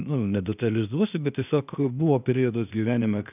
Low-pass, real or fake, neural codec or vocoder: 3.6 kHz; fake; codec, 16 kHz, 0.8 kbps, ZipCodec